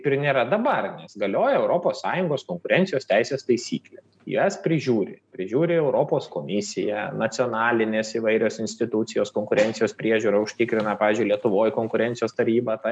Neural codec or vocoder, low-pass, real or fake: none; 9.9 kHz; real